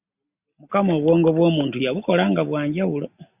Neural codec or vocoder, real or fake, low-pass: none; real; 3.6 kHz